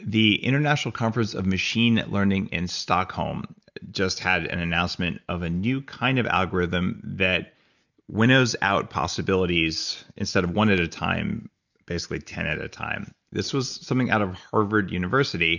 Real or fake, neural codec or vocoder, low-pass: real; none; 7.2 kHz